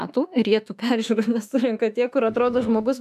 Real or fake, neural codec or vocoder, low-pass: fake; autoencoder, 48 kHz, 32 numbers a frame, DAC-VAE, trained on Japanese speech; 14.4 kHz